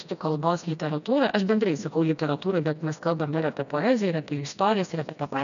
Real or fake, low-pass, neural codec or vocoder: fake; 7.2 kHz; codec, 16 kHz, 1 kbps, FreqCodec, smaller model